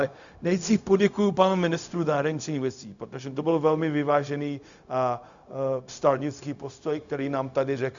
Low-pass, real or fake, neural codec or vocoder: 7.2 kHz; fake; codec, 16 kHz, 0.4 kbps, LongCat-Audio-Codec